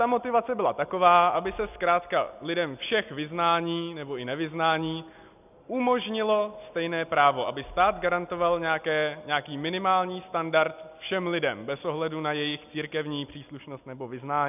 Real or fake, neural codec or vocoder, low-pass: real; none; 3.6 kHz